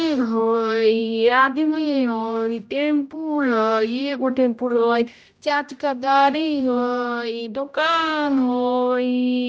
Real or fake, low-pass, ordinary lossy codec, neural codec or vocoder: fake; none; none; codec, 16 kHz, 0.5 kbps, X-Codec, HuBERT features, trained on general audio